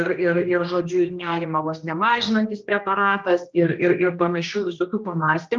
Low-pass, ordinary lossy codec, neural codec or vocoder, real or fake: 7.2 kHz; Opus, 24 kbps; codec, 16 kHz, 1 kbps, X-Codec, HuBERT features, trained on general audio; fake